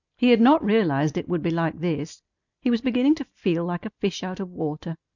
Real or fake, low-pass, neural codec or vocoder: real; 7.2 kHz; none